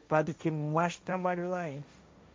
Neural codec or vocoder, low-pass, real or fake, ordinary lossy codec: codec, 16 kHz, 1.1 kbps, Voila-Tokenizer; none; fake; none